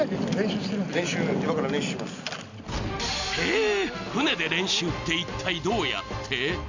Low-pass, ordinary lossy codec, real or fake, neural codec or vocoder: 7.2 kHz; none; real; none